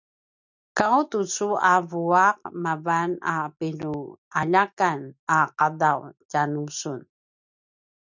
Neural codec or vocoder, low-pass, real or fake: none; 7.2 kHz; real